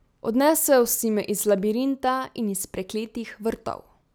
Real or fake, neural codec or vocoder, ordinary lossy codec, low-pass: real; none; none; none